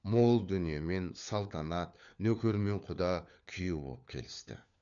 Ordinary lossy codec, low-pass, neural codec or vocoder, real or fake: none; 7.2 kHz; codec, 16 kHz, 4 kbps, FunCodec, trained on LibriTTS, 50 frames a second; fake